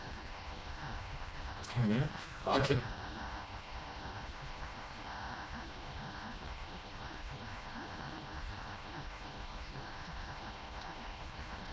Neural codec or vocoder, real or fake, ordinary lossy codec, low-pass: codec, 16 kHz, 1 kbps, FreqCodec, smaller model; fake; none; none